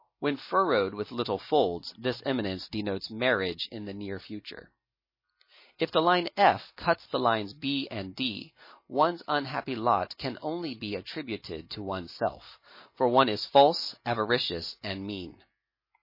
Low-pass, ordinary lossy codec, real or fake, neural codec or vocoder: 5.4 kHz; MP3, 24 kbps; real; none